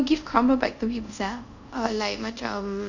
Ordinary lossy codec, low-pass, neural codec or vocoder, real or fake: none; 7.2 kHz; codec, 24 kHz, 0.5 kbps, DualCodec; fake